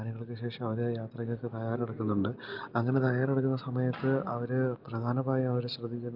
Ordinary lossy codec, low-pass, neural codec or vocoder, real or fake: Opus, 32 kbps; 5.4 kHz; none; real